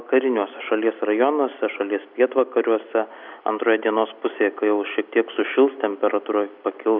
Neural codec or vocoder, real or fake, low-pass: none; real; 5.4 kHz